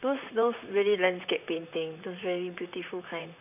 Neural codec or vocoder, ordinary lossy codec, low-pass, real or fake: vocoder, 44.1 kHz, 128 mel bands, Pupu-Vocoder; none; 3.6 kHz; fake